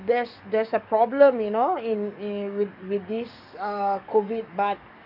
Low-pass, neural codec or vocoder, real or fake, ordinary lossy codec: 5.4 kHz; codec, 44.1 kHz, 7.8 kbps, DAC; fake; none